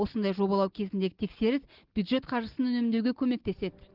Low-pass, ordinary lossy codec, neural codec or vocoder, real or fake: 5.4 kHz; Opus, 16 kbps; none; real